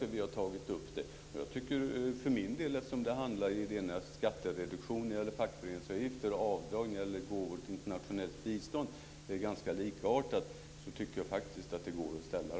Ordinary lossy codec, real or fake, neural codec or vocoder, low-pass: none; real; none; none